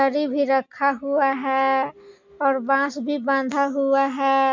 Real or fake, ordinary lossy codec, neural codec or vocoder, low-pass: real; MP3, 48 kbps; none; 7.2 kHz